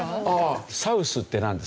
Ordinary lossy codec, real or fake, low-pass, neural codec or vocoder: none; real; none; none